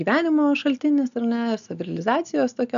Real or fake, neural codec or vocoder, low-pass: real; none; 7.2 kHz